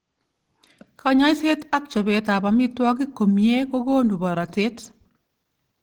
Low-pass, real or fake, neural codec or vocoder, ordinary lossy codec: 19.8 kHz; real; none; Opus, 16 kbps